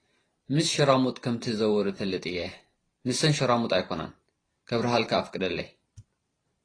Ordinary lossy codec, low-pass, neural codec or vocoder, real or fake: AAC, 32 kbps; 9.9 kHz; vocoder, 44.1 kHz, 128 mel bands every 512 samples, BigVGAN v2; fake